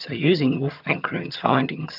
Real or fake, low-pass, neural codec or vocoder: fake; 5.4 kHz; vocoder, 22.05 kHz, 80 mel bands, HiFi-GAN